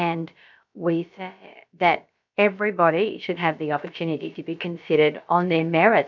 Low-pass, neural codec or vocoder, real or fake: 7.2 kHz; codec, 16 kHz, about 1 kbps, DyCAST, with the encoder's durations; fake